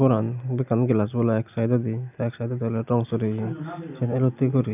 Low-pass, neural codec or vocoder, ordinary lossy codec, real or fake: 3.6 kHz; none; none; real